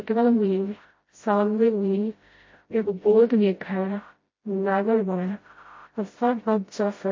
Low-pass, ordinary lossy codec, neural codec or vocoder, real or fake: 7.2 kHz; MP3, 32 kbps; codec, 16 kHz, 0.5 kbps, FreqCodec, smaller model; fake